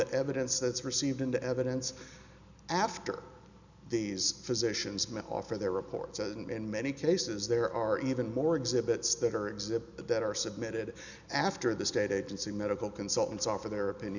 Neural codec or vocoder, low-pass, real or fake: none; 7.2 kHz; real